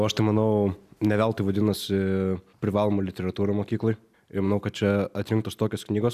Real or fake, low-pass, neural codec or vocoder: real; 14.4 kHz; none